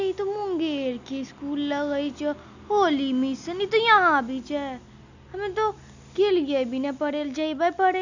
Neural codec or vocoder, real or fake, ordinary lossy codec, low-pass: none; real; none; 7.2 kHz